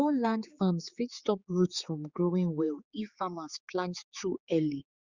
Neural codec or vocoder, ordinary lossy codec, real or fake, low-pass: codec, 16 kHz, 4 kbps, X-Codec, HuBERT features, trained on general audio; Opus, 64 kbps; fake; 7.2 kHz